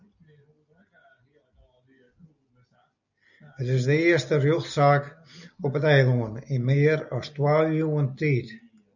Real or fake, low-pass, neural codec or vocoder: real; 7.2 kHz; none